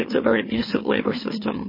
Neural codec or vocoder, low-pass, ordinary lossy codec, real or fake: autoencoder, 44.1 kHz, a latent of 192 numbers a frame, MeloTTS; 5.4 kHz; MP3, 24 kbps; fake